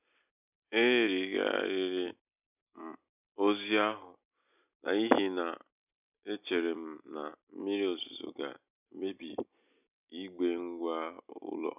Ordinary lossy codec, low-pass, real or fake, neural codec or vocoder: none; 3.6 kHz; real; none